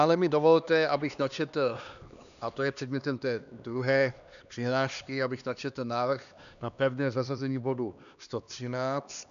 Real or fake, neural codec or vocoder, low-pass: fake; codec, 16 kHz, 2 kbps, X-Codec, HuBERT features, trained on LibriSpeech; 7.2 kHz